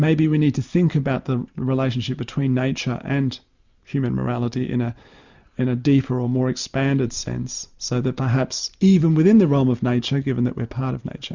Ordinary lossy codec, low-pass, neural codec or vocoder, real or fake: Opus, 64 kbps; 7.2 kHz; none; real